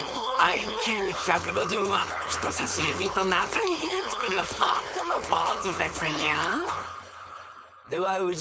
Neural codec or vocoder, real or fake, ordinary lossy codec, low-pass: codec, 16 kHz, 4.8 kbps, FACodec; fake; none; none